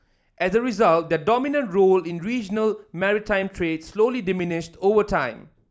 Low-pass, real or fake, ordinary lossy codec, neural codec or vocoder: none; real; none; none